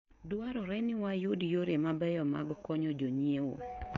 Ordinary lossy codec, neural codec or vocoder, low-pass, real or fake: none; codec, 16 kHz, 16 kbps, FreqCodec, smaller model; 7.2 kHz; fake